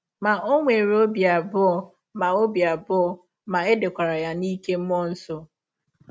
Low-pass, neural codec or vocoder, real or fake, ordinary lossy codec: none; none; real; none